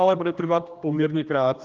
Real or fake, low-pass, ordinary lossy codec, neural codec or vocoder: fake; 7.2 kHz; Opus, 32 kbps; codec, 16 kHz, 1 kbps, X-Codec, HuBERT features, trained on general audio